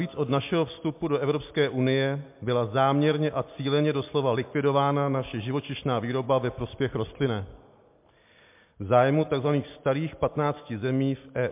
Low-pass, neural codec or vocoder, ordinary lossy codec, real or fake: 3.6 kHz; none; MP3, 32 kbps; real